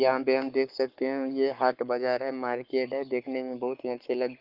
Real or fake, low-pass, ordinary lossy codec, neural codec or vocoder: fake; 5.4 kHz; Opus, 32 kbps; codec, 44.1 kHz, 7.8 kbps, Pupu-Codec